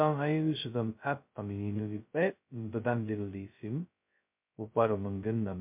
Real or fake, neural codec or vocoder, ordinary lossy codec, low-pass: fake; codec, 16 kHz, 0.2 kbps, FocalCodec; MP3, 32 kbps; 3.6 kHz